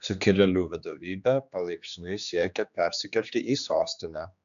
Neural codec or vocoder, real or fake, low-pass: codec, 16 kHz, 2 kbps, X-Codec, HuBERT features, trained on general audio; fake; 7.2 kHz